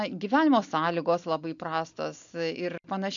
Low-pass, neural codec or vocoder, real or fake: 7.2 kHz; none; real